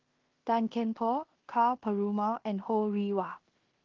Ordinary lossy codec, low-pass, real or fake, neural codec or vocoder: Opus, 16 kbps; 7.2 kHz; fake; codec, 16 kHz in and 24 kHz out, 0.9 kbps, LongCat-Audio-Codec, fine tuned four codebook decoder